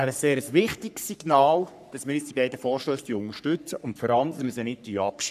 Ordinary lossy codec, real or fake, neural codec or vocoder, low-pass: none; fake; codec, 44.1 kHz, 3.4 kbps, Pupu-Codec; 14.4 kHz